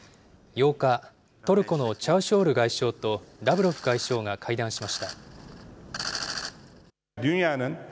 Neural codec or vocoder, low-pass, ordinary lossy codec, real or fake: none; none; none; real